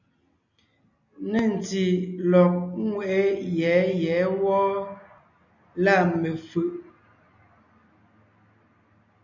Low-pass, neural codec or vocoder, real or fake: 7.2 kHz; none; real